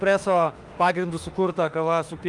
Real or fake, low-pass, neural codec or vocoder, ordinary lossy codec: fake; 10.8 kHz; autoencoder, 48 kHz, 32 numbers a frame, DAC-VAE, trained on Japanese speech; Opus, 32 kbps